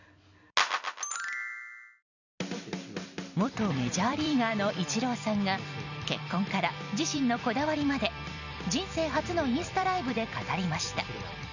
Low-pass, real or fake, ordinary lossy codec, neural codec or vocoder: 7.2 kHz; fake; none; vocoder, 44.1 kHz, 128 mel bands every 256 samples, BigVGAN v2